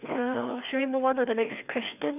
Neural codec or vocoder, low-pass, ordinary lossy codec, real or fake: codec, 16 kHz, 2 kbps, FreqCodec, larger model; 3.6 kHz; none; fake